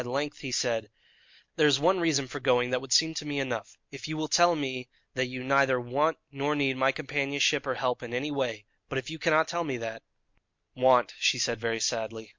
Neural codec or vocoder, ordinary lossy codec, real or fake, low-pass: none; MP3, 48 kbps; real; 7.2 kHz